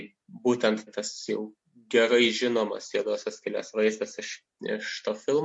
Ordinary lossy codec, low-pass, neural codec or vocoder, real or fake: MP3, 48 kbps; 10.8 kHz; none; real